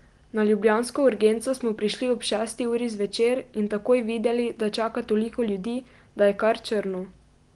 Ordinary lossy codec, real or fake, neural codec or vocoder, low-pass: Opus, 24 kbps; real; none; 10.8 kHz